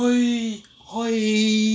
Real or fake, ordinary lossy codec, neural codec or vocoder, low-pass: real; none; none; none